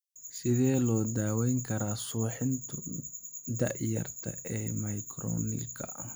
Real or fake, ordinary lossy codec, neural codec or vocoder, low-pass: real; none; none; none